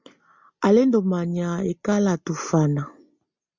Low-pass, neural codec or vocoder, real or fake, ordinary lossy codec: 7.2 kHz; none; real; MP3, 64 kbps